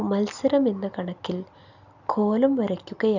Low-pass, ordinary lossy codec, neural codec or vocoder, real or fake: 7.2 kHz; none; none; real